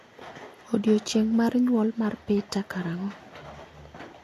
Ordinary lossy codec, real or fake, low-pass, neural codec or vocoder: AAC, 64 kbps; real; 14.4 kHz; none